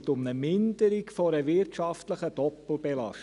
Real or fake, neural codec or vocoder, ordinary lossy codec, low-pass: real; none; none; 10.8 kHz